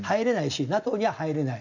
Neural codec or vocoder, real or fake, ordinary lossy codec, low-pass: none; real; none; 7.2 kHz